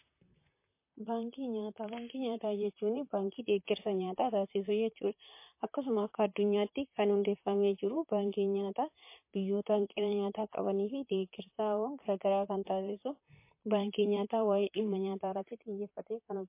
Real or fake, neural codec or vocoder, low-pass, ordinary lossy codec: fake; vocoder, 44.1 kHz, 128 mel bands, Pupu-Vocoder; 3.6 kHz; MP3, 24 kbps